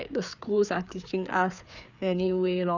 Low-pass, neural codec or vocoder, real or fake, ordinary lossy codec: 7.2 kHz; codec, 16 kHz, 4 kbps, FreqCodec, larger model; fake; none